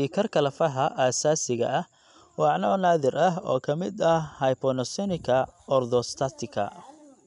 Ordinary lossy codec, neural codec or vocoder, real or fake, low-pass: none; none; real; 10.8 kHz